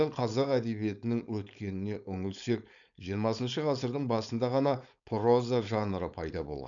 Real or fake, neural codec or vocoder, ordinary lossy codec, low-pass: fake; codec, 16 kHz, 4.8 kbps, FACodec; none; 7.2 kHz